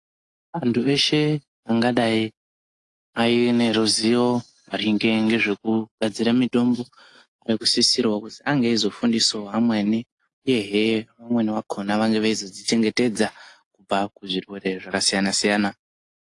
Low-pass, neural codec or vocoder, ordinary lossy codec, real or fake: 10.8 kHz; none; AAC, 48 kbps; real